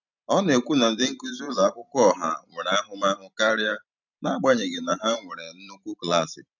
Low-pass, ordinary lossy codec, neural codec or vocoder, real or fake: 7.2 kHz; none; vocoder, 44.1 kHz, 128 mel bands every 512 samples, BigVGAN v2; fake